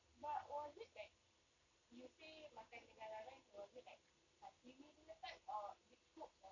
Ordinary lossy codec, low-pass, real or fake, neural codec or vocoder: none; 7.2 kHz; real; none